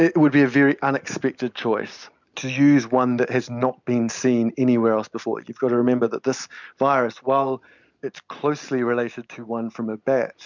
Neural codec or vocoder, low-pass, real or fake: none; 7.2 kHz; real